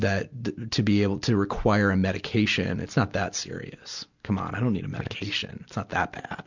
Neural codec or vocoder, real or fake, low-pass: none; real; 7.2 kHz